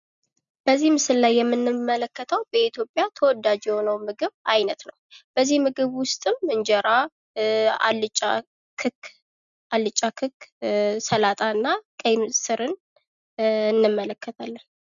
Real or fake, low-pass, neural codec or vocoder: real; 7.2 kHz; none